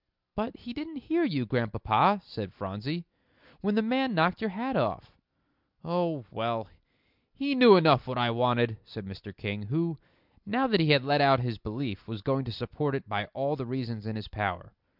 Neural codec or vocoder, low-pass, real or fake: none; 5.4 kHz; real